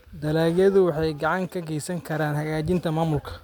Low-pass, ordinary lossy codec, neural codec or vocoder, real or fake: 19.8 kHz; none; none; real